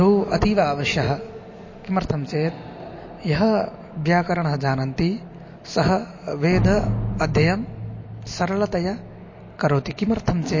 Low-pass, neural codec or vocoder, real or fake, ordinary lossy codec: 7.2 kHz; none; real; MP3, 32 kbps